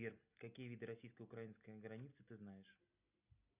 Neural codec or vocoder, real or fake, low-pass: none; real; 3.6 kHz